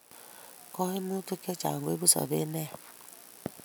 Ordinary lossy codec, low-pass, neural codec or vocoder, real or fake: none; none; none; real